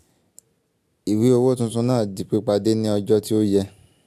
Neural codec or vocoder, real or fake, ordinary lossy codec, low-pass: none; real; AAC, 96 kbps; 14.4 kHz